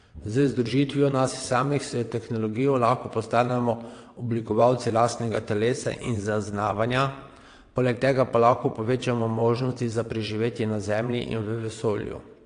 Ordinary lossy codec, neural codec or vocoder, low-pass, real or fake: AAC, 48 kbps; vocoder, 22.05 kHz, 80 mel bands, WaveNeXt; 9.9 kHz; fake